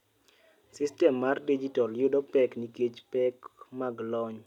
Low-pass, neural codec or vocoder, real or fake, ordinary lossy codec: 19.8 kHz; none; real; none